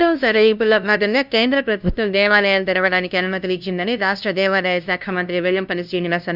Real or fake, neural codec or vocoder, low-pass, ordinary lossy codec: fake; codec, 16 kHz, 0.5 kbps, FunCodec, trained on LibriTTS, 25 frames a second; 5.4 kHz; none